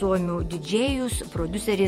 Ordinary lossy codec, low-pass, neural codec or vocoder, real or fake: MP3, 64 kbps; 19.8 kHz; vocoder, 48 kHz, 128 mel bands, Vocos; fake